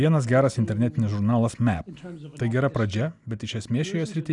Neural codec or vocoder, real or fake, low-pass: none; real; 10.8 kHz